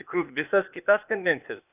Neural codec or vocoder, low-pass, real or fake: codec, 16 kHz, 0.8 kbps, ZipCodec; 3.6 kHz; fake